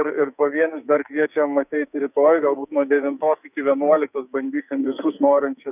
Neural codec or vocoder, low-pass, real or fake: codec, 44.1 kHz, 2.6 kbps, SNAC; 3.6 kHz; fake